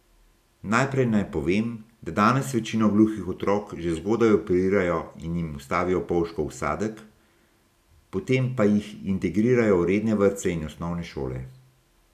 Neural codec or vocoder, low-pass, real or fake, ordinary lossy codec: none; 14.4 kHz; real; none